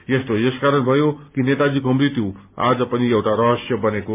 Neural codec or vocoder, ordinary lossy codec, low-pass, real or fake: none; MP3, 32 kbps; 3.6 kHz; real